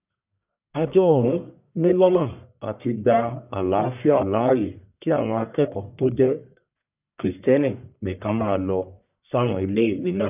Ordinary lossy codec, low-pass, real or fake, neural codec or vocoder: none; 3.6 kHz; fake; codec, 44.1 kHz, 1.7 kbps, Pupu-Codec